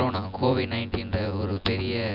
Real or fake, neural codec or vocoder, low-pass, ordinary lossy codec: fake; vocoder, 24 kHz, 100 mel bands, Vocos; 5.4 kHz; none